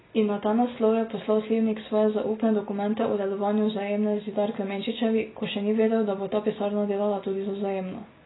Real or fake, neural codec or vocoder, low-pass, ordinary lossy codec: real; none; 7.2 kHz; AAC, 16 kbps